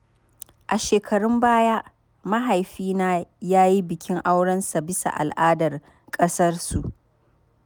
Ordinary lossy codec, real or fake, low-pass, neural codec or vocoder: none; real; none; none